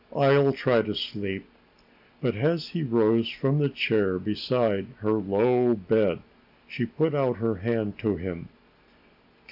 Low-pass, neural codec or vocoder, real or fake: 5.4 kHz; none; real